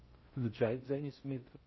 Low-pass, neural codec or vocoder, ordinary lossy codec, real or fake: 7.2 kHz; codec, 16 kHz in and 24 kHz out, 0.6 kbps, FocalCodec, streaming, 4096 codes; MP3, 24 kbps; fake